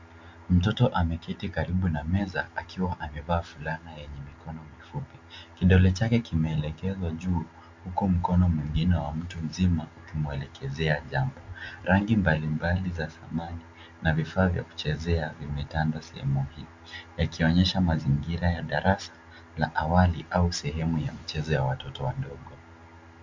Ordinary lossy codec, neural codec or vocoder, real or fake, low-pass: MP3, 64 kbps; none; real; 7.2 kHz